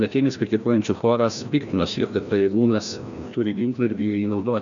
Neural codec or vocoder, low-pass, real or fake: codec, 16 kHz, 1 kbps, FreqCodec, larger model; 7.2 kHz; fake